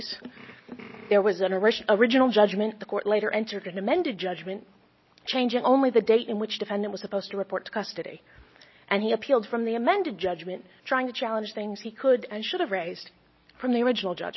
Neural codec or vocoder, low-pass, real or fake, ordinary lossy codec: none; 7.2 kHz; real; MP3, 24 kbps